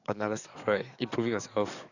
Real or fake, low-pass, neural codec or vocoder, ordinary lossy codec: fake; 7.2 kHz; codec, 44.1 kHz, 7.8 kbps, DAC; none